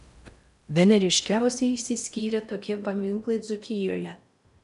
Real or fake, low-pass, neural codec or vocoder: fake; 10.8 kHz; codec, 16 kHz in and 24 kHz out, 0.6 kbps, FocalCodec, streaming, 4096 codes